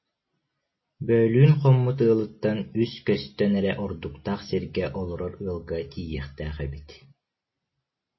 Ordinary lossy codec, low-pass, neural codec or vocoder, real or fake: MP3, 24 kbps; 7.2 kHz; none; real